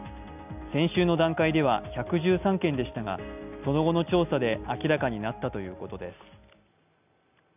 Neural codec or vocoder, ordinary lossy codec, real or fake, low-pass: none; none; real; 3.6 kHz